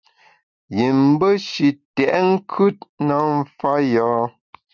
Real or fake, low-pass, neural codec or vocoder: real; 7.2 kHz; none